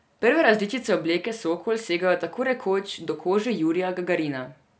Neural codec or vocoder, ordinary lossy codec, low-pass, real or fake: none; none; none; real